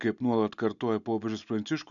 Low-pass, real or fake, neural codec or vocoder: 7.2 kHz; real; none